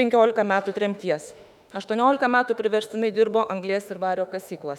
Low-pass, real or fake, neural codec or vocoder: 19.8 kHz; fake; autoencoder, 48 kHz, 32 numbers a frame, DAC-VAE, trained on Japanese speech